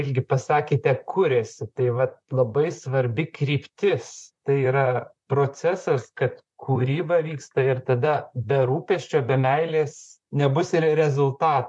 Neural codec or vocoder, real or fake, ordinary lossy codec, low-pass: vocoder, 44.1 kHz, 128 mel bands, Pupu-Vocoder; fake; MP3, 64 kbps; 10.8 kHz